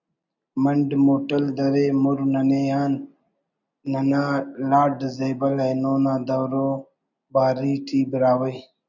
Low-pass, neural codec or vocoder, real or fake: 7.2 kHz; none; real